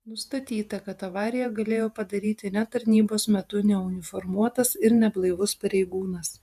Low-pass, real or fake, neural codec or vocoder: 14.4 kHz; fake; vocoder, 48 kHz, 128 mel bands, Vocos